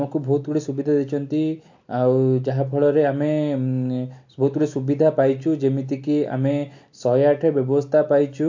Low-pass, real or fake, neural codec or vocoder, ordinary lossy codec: 7.2 kHz; real; none; MP3, 48 kbps